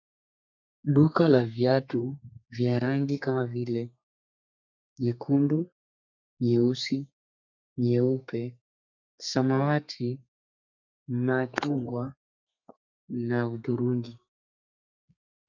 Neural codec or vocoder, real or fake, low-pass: codec, 32 kHz, 1.9 kbps, SNAC; fake; 7.2 kHz